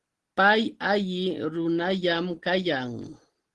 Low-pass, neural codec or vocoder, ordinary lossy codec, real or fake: 10.8 kHz; none; Opus, 16 kbps; real